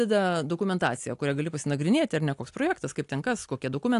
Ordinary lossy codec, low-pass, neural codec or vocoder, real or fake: AAC, 64 kbps; 10.8 kHz; none; real